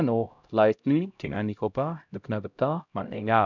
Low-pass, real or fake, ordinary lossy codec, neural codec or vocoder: 7.2 kHz; fake; none; codec, 16 kHz, 0.5 kbps, X-Codec, HuBERT features, trained on LibriSpeech